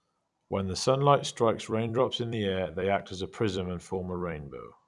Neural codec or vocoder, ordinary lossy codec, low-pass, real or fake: vocoder, 44.1 kHz, 128 mel bands every 256 samples, BigVGAN v2; none; 10.8 kHz; fake